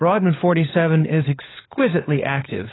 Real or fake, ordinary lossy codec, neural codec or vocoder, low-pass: fake; AAC, 16 kbps; codec, 16 kHz, 16 kbps, FunCodec, trained on LibriTTS, 50 frames a second; 7.2 kHz